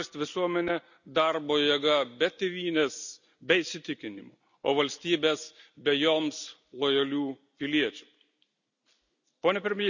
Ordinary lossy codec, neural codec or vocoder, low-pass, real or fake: none; none; 7.2 kHz; real